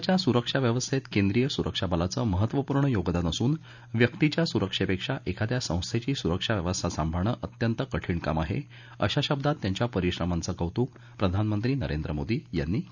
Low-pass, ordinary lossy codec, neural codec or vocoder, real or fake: 7.2 kHz; none; none; real